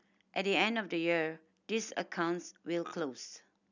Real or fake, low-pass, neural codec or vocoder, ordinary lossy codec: real; 7.2 kHz; none; none